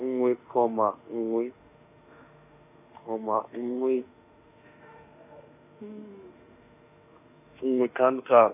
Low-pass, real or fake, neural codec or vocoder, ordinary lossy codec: 3.6 kHz; fake; autoencoder, 48 kHz, 32 numbers a frame, DAC-VAE, trained on Japanese speech; none